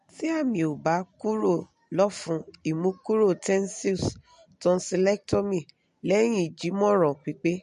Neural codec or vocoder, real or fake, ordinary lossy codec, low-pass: none; real; MP3, 48 kbps; 10.8 kHz